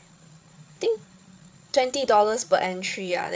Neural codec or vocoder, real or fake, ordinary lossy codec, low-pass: codec, 16 kHz, 8 kbps, FreqCodec, larger model; fake; none; none